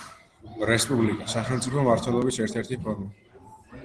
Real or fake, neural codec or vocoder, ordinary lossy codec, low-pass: real; none; Opus, 16 kbps; 10.8 kHz